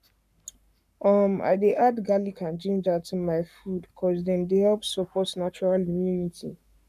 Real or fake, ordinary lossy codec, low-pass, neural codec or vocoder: fake; none; 14.4 kHz; codec, 44.1 kHz, 7.8 kbps, Pupu-Codec